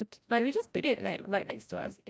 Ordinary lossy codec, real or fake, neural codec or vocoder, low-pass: none; fake; codec, 16 kHz, 0.5 kbps, FreqCodec, larger model; none